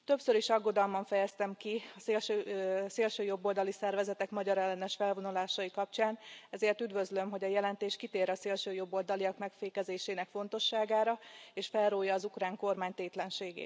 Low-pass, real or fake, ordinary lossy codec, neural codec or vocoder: none; real; none; none